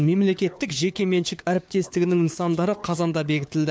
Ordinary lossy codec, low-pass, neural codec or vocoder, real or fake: none; none; codec, 16 kHz, 4 kbps, FunCodec, trained on LibriTTS, 50 frames a second; fake